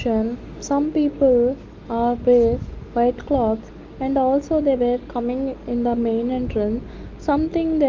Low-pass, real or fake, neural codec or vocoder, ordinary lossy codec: 7.2 kHz; real; none; Opus, 32 kbps